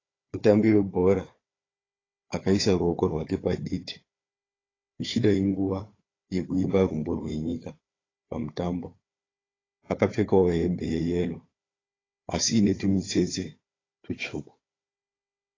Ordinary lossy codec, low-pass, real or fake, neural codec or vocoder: AAC, 32 kbps; 7.2 kHz; fake; codec, 16 kHz, 4 kbps, FunCodec, trained on Chinese and English, 50 frames a second